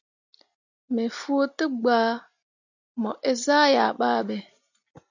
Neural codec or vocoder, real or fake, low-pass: none; real; 7.2 kHz